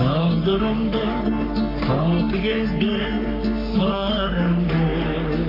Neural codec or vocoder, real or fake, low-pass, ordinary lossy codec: codec, 44.1 kHz, 3.4 kbps, Pupu-Codec; fake; 5.4 kHz; AAC, 24 kbps